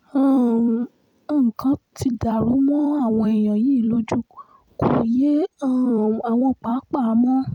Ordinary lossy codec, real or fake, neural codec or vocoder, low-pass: none; fake; vocoder, 44.1 kHz, 128 mel bands every 256 samples, BigVGAN v2; 19.8 kHz